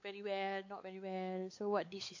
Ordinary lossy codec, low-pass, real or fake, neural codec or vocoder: AAC, 48 kbps; 7.2 kHz; fake; codec, 16 kHz, 4 kbps, X-Codec, WavLM features, trained on Multilingual LibriSpeech